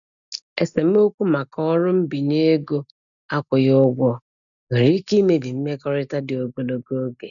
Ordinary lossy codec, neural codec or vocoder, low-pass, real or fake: none; none; 7.2 kHz; real